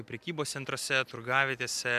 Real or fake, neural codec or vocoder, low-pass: real; none; 14.4 kHz